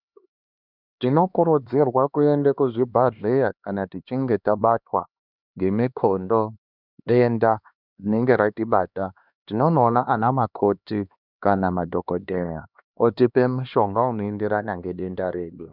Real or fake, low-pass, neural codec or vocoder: fake; 5.4 kHz; codec, 16 kHz, 2 kbps, X-Codec, HuBERT features, trained on LibriSpeech